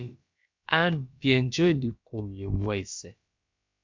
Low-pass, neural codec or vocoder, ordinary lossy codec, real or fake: 7.2 kHz; codec, 16 kHz, about 1 kbps, DyCAST, with the encoder's durations; MP3, 64 kbps; fake